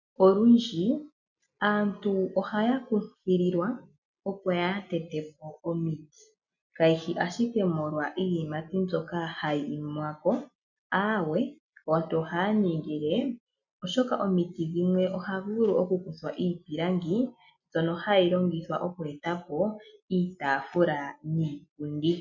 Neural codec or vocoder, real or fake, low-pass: none; real; 7.2 kHz